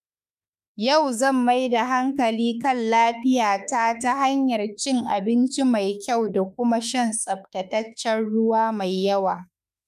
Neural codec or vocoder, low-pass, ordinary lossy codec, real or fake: autoencoder, 48 kHz, 32 numbers a frame, DAC-VAE, trained on Japanese speech; 14.4 kHz; none; fake